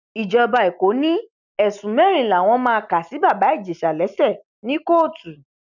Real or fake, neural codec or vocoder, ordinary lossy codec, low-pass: real; none; none; 7.2 kHz